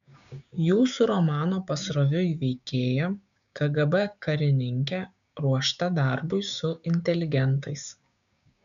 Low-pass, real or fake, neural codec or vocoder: 7.2 kHz; fake; codec, 16 kHz, 6 kbps, DAC